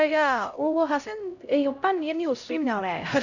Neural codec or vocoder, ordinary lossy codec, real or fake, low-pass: codec, 16 kHz, 0.5 kbps, X-Codec, HuBERT features, trained on LibriSpeech; none; fake; 7.2 kHz